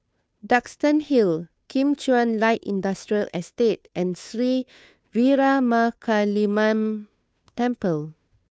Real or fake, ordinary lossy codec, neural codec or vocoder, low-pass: fake; none; codec, 16 kHz, 2 kbps, FunCodec, trained on Chinese and English, 25 frames a second; none